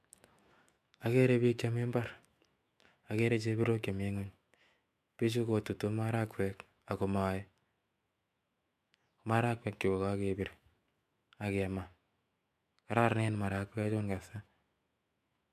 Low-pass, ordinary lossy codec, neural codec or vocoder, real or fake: 14.4 kHz; MP3, 96 kbps; autoencoder, 48 kHz, 128 numbers a frame, DAC-VAE, trained on Japanese speech; fake